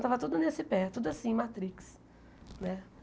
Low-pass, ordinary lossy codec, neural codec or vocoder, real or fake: none; none; none; real